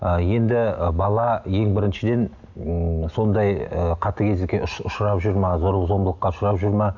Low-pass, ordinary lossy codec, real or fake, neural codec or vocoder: 7.2 kHz; none; real; none